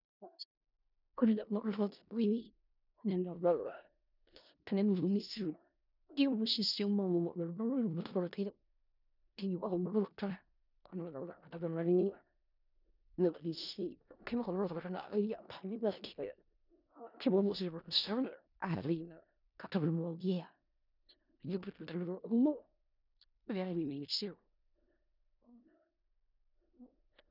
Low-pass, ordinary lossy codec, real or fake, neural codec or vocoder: 5.4 kHz; none; fake; codec, 16 kHz in and 24 kHz out, 0.4 kbps, LongCat-Audio-Codec, four codebook decoder